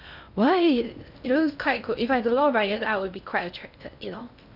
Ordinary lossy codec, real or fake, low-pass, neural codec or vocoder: none; fake; 5.4 kHz; codec, 16 kHz in and 24 kHz out, 0.6 kbps, FocalCodec, streaming, 2048 codes